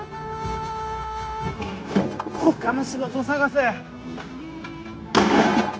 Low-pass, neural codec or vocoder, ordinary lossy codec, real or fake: none; codec, 16 kHz, 0.9 kbps, LongCat-Audio-Codec; none; fake